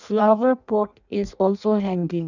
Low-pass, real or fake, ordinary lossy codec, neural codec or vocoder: 7.2 kHz; fake; none; codec, 16 kHz in and 24 kHz out, 0.6 kbps, FireRedTTS-2 codec